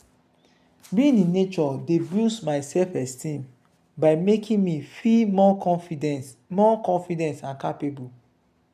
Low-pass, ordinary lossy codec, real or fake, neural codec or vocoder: 14.4 kHz; none; real; none